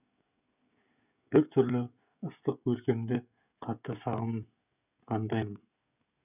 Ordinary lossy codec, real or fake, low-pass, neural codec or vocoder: none; fake; 3.6 kHz; codec, 16 kHz, 16 kbps, FreqCodec, smaller model